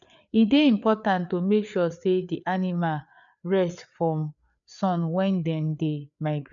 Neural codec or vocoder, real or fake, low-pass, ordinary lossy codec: codec, 16 kHz, 4 kbps, FreqCodec, larger model; fake; 7.2 kHz; none